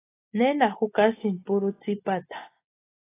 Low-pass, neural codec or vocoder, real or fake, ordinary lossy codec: 3.6 kHz; none; real; AAC, 16 kbps